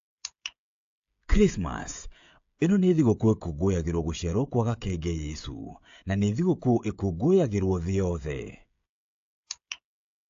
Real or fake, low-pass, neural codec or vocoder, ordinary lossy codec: fake; 7.2 kHz; codec, 16 kHz, 16 kbps, FreqCodec, smaller model; AAC, 48 kbps